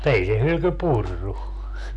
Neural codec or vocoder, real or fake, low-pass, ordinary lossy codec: none; real; none; none